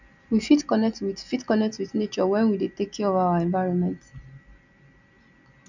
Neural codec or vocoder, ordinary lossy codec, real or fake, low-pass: none; none; real; 7.2 kHz